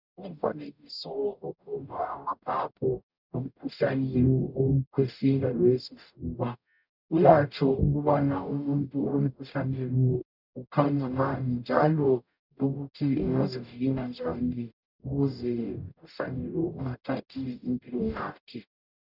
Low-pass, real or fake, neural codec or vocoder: 5.4 kHz; fake; codec, 44.1 kHz, 0.9 kbps, DAC